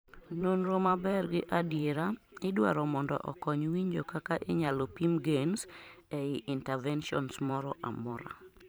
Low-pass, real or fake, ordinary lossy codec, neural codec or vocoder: none; fake; none; vocoder, 44.1 kHz, 128 mel bands every 512 samples, BigVGAN v2